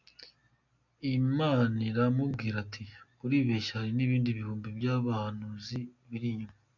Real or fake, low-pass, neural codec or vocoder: real; 7.2 kHz; none